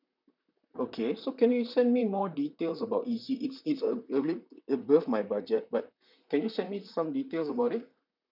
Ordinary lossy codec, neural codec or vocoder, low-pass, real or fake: none; vocoder, 44.1 kHz, 128 mel bands, Pupu-Vocoder; 5.4 kHz; fake